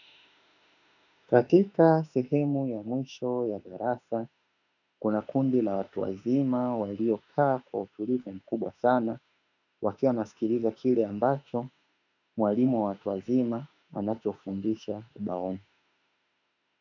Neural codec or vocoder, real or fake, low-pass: autoencoder, 48 kHz, 32 numbers a frame, DAC-VAE, trained on Japanese speech; fake; 7.2 kHz